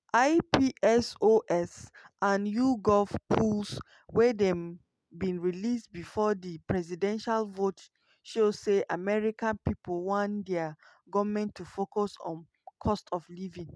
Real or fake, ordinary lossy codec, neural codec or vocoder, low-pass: real; none; none; none